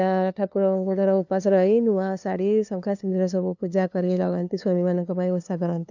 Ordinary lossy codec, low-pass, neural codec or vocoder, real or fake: none; 7.2 kHz; codec, 16 kHz, 2 kbps, FunCodec, trained on LibriTTS, 25 frames a second; fake